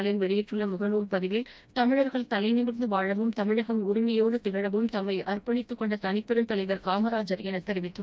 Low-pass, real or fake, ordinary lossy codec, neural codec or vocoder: none; fake; none; codec, 16 kHz, 1 kbps, FreqCodec, smaller model